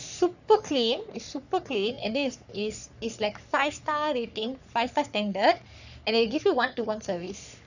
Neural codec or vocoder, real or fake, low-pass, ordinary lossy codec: codec, 44.1 kHz, 3.4 kbps, Pupu-Codec; fake; 7.2 kHz; none